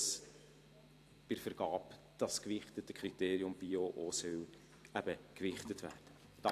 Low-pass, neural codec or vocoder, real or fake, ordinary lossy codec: 14.4 kHz; vocoder, 44.1 kHz, 128 mel bands every 256 samples, BigVGAN v2; fake; AAC, 64 kbps